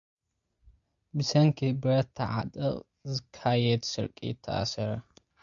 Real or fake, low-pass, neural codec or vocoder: real; 7.2 kHz; none